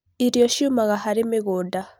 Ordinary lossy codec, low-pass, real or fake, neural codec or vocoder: none; none; real; none